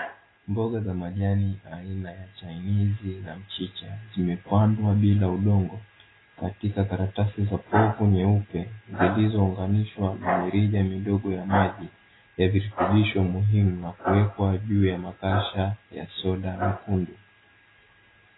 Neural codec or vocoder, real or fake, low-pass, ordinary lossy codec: none; real; 7.2 kHz; AAC, 16 kbps